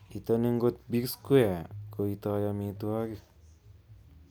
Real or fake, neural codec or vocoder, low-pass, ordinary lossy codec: real; none; none; none